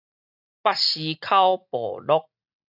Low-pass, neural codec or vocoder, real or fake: 5.4 kHz; none; real